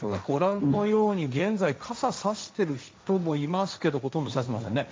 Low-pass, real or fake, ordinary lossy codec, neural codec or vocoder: none; fake; none; codec, 16 kHz, 1.1 kbps, Voila-Tokenizer